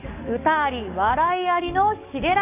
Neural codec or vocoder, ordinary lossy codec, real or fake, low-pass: codec, 44.1 kHz, 7.8 kbps, DAC; none; fake; 3.6 kHz